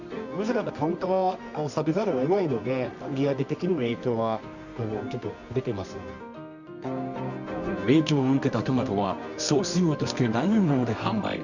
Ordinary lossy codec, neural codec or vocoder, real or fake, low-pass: none; codec, 24 kHz, 0.9 kbps, WavTokenizer, medium music audio release; fake; 7.2 kHz